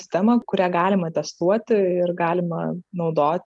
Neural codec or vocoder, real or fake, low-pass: none; real; 10.8 kHz